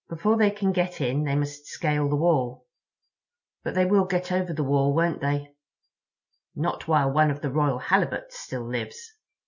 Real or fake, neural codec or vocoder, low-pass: real; none; 7.2 kHz